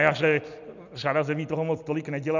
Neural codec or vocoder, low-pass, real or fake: none; 7.2 kHz; real